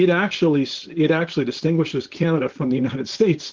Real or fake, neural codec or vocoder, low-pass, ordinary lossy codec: fake; codec, 16 kHz, 4.8 kbps, FACodec; 7.2 kHz; Opus, 16 kbps